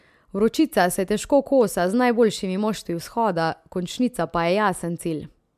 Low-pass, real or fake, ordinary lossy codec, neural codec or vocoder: 14.4 kHz; real; MP3, 96 kbps; none